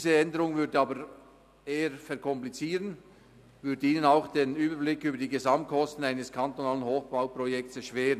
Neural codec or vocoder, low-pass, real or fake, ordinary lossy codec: none; 14.4 kHz; real; none